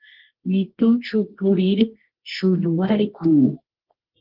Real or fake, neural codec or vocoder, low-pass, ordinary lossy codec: fake; codec, 24 kHz, 0.9 kbps, WavTokenizer, medium music audio release; 5.4 kHz; Opus, 16 kbps